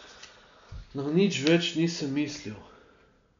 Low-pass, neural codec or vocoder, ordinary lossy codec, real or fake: 7.2 kHz; none; MP3, 64 kbps; real